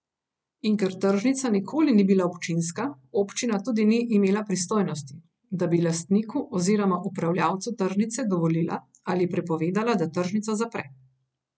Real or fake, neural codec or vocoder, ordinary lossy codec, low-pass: real; none; none; none